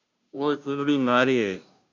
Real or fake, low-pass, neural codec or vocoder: fake; 7.2 kHz; codec, 16 kHz, 0.5 kbps, FunCodec, trained on Chinese and English, 25 frames a second